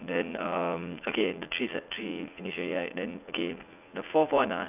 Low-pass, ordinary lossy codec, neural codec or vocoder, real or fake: 3.6 kHz; none; vocoder, 44.1 kHz, 80 mel bands, Vocos; fake